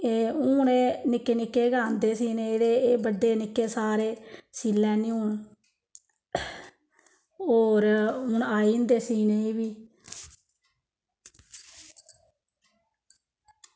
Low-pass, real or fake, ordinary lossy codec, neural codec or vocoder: none; real; none; none